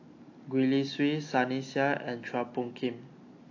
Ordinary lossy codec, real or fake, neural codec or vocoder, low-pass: AAC, 48 kbps; real; none; 7.2 kHz